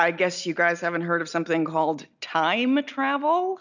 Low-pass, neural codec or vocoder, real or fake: 7.2 kHz; none; real